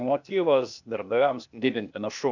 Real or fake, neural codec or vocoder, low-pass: fake; codec, 16 kHz, 0.8 kbps, ZipCodec; 7.2 kHz